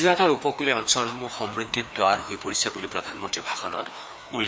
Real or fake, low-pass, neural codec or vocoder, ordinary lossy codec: fake; none; codec, 16 kHz, 2 kbps, FreqCodec, larger model; none